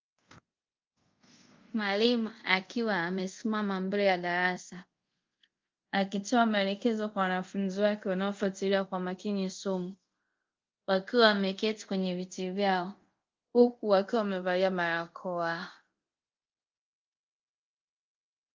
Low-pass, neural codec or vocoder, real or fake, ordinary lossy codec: 7.2 kHz; codec, 24 kHz, 0.5 kbps, DualCodec; fake; Opus, 32 kbps